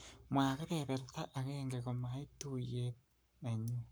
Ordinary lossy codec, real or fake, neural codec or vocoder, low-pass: none; fake; codec, 44.1 kHz, 7.8 kbps, Pupu-Codec; none